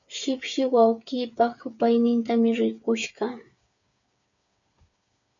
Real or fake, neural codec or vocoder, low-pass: fake; codec, 16 kHz, 8 kbps, FreqCodec, smaller model; 7.2 kHz